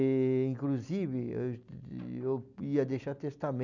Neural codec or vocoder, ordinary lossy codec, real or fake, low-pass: none; none; real; 7.2 kHz